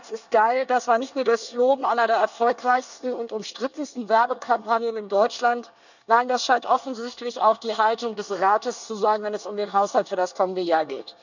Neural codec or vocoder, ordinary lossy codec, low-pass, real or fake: codec, 24 kHz, 1 kbps, SNAC; none; 7.2 kHz; fake